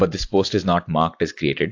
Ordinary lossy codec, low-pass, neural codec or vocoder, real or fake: AAC, 48 kbps; 7.2 kHz; none; real